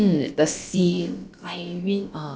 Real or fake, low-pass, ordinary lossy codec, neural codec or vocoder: fake; none; none; codec, 16 kHz, about 1 kbps, DyCAST, with the encoder's durations